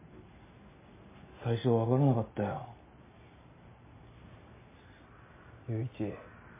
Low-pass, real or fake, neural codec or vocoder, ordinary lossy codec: 3.6 kHz; real; none; MP3, 16 kbps